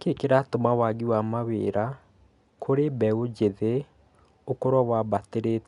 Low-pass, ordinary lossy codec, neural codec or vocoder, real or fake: 10.8 kHz; none; none; real